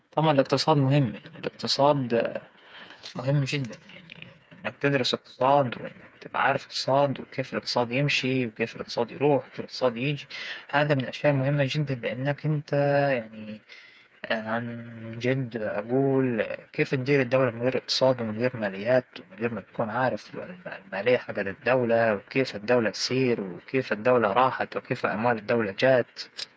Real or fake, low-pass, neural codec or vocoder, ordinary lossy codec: fake; none; codec, 16 kHz, 4 kbps, FreqCodec, smaller model; none